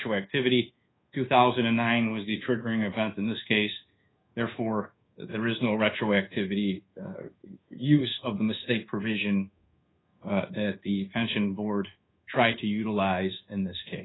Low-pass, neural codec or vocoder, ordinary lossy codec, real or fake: 7.2 kHz; codec, 24 kHz, 1.2 kbps, DualCodec; AAC, 16 kbps; fake